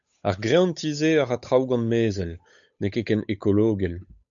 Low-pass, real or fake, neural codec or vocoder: 7.2 kHz; fake; codec, 16 kHz, 8 kbps, FunCodec, trained on Chinese and English, 25 frames a second